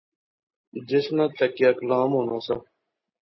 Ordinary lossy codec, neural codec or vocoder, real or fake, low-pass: MP3, 24 kbps; none; real; 7.2 kHz